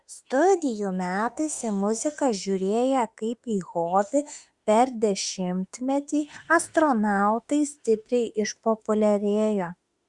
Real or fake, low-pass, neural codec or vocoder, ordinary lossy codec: fake; 10.8 kHz; autoencoder, 48 kHz, 32 numbers a frame, DAC-VAE, trained on Japanese speech; Opus, 64 kbps